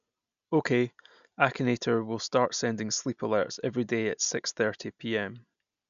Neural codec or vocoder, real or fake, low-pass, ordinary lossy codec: none; real; 7.2 kHz; none